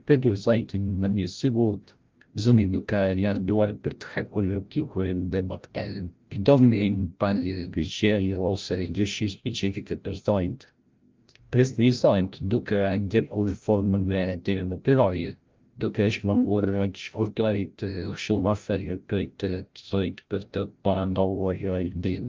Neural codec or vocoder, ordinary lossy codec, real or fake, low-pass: codec, 16 kHz, 0.5 kbps, FreqCodec, larger model; Opus, 24 kbps; fake; 7.2 kHz